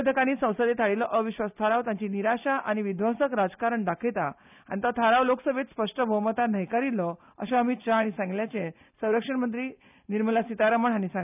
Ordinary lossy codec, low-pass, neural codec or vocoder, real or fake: none; 3.6 kHz; none; real